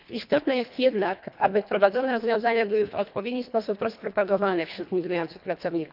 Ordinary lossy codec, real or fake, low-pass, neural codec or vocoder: none; fake; 5.4 kHz; codec, 24 kHz, 1.5 kbps, HILCodec